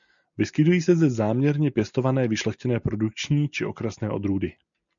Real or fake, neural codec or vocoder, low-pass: real; none; 7.2 kHz